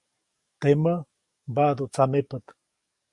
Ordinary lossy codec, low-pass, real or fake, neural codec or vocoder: Opus, 64 kbps; 10.8 kHz; fake; codec, 44.1 kHz, 7.8 kbps, DAC